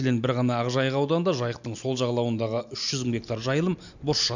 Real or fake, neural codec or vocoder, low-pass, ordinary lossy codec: real; none; 7.2 kHz; none